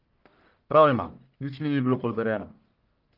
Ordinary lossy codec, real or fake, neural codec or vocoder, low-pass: Opus, 32 kbps; fake; codec, 44.1 kHz, 1.7 kbps, Pupu-Codec; 5.4 kHz